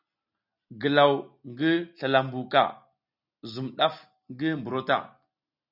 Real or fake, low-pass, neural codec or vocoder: real; 5.4 kHz; none